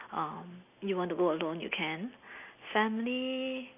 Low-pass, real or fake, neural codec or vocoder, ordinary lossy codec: 3.6 kHz; real; none; none